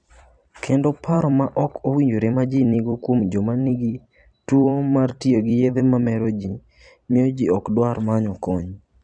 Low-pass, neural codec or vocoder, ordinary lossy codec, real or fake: 9.9 kHz; vocoder, 44.1 kHz, 128 mel bands every 256 samples, BigVGAN v2; none; fake